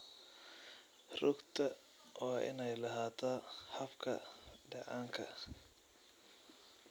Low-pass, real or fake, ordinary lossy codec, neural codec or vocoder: none; real; none; none